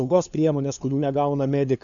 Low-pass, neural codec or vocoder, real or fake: 7.2 kHz; codec, 16 kHz, 2 kbps, FunCodec, trained on LibriTTS, 25 frames a second; fake